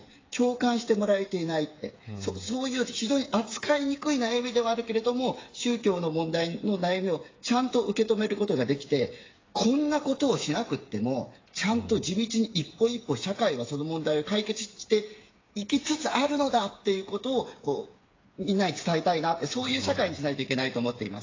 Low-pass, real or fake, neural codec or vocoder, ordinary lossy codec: 7.2 kHz; fake; codec, 16 kHz, 8 kbps, FreqCodec, smaller model; AAC, 32 kbps